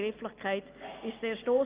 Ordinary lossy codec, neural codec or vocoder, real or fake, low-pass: Opus, 24 kbps; none; real; 3.6 kHz